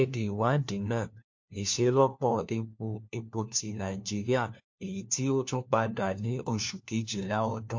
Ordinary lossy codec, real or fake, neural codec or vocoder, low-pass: MP3, 48 kbps; fake; codec, 16 kHz, 1 kbps, FunCodec, trained on LibriTTS, 50 frames a second; 7.2 kHz